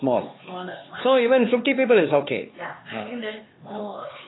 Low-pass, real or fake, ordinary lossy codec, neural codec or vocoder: 7.2 kHz; fake; AAC, 16 kbps; codec, 16 kHz, 4 kbps, X-Codec, HuBERT features, trained on LibriSpeech